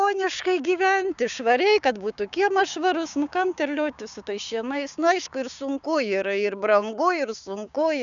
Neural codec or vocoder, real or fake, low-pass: codec, 16 kHz, 6 kbps, DAC; fake; 7.2 kHz